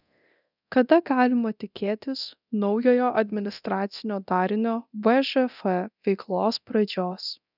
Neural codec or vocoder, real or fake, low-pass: codec, 24 kHz, 0.9 kbps, DualCodec; fake; 5.4 kHz